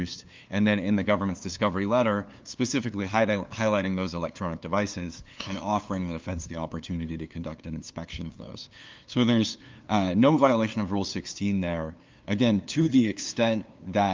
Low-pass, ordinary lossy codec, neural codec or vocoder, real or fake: 7.2 kHz; Opus, 24 kbps; codec, 16 kHz, 2 kbps, FunCodec, trained on LibriTTS, 25 frames a second; fake